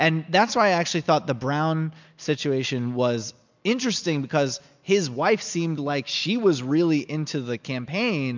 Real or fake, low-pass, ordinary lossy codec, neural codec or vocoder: real; 7.2 kHz; MP3, 64 kbps; none